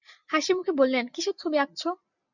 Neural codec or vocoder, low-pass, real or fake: none; 7.2 kHz; real